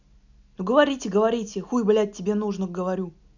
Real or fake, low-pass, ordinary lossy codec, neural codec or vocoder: real; 7.2 kHz; none; none